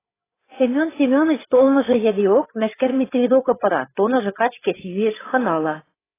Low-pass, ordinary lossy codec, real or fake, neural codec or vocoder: 3.6 kHz; AAC, 16 kbps; fake; codec, 16 kHz, 8 kbps, FreqCodec, larger model